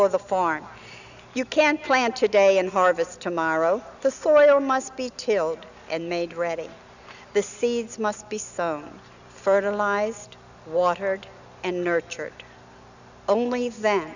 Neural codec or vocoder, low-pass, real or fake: none; 7.2 kHz; real